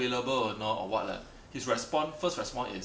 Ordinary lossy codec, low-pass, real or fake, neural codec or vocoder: none; none; real; none